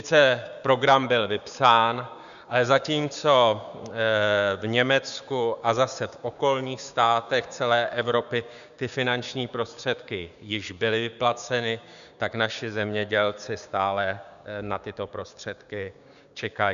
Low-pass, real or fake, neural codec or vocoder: 7.2 kHz; fake; codec, 16 kHz, 6 kbps, DAC